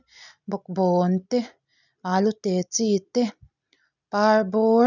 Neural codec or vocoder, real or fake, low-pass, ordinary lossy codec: none; real; 7.2 kHz; none